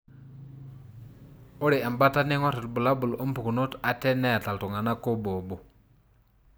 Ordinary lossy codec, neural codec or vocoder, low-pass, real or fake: none; none; none; real